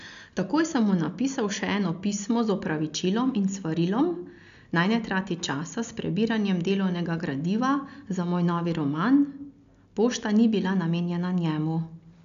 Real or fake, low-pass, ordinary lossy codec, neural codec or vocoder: real; 7.2 kHz; none; none